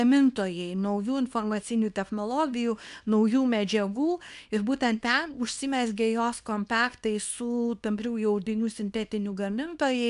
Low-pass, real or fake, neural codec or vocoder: 10.8 kHz; fake; codec, 24 kHz, 0.9 kbps, WavTokenizer, small release